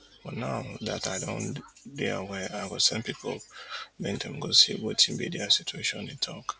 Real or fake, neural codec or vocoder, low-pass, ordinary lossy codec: real; none; none; none